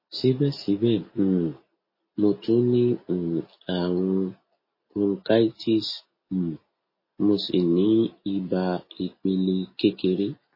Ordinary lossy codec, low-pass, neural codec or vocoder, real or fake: MP3, 24 kbps; 5.4 kHz; none; real